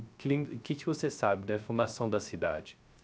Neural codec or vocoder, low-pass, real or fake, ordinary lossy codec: codec, 16 kHz, 0.3 kbps, FocalCodec; none; fake; none